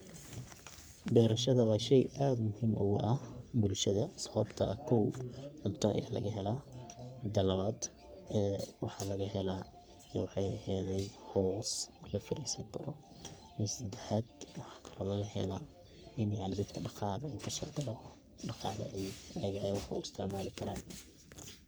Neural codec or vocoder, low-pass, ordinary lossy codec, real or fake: codec, 44.1 kHz, 3.4 kbps, Pupu-Codec; none; none; fake